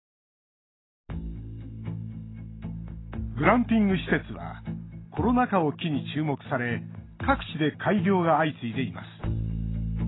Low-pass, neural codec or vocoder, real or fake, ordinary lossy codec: 7.2 kHz; none; real; AAC, 16 kbps